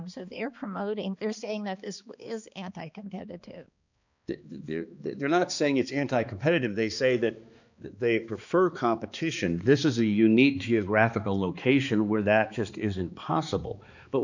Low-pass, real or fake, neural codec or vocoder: 7.2 kHz; fake; codec, 16 kHz, 2 kbps, X-Codec, HuBERT features, trained on balanced general audio